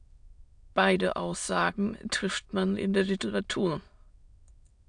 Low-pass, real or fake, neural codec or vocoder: 9.9 kHz; fake; autoencoder, 22.05 kHz, a latent of 192 numbers a frame, VITS, trained on many speakers